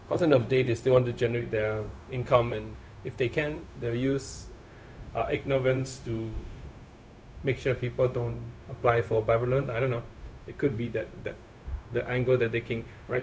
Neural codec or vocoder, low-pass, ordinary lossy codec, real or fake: codec, 16 kHz, 0.4 kbps, LongCat-Audio-Codec; none; none; fake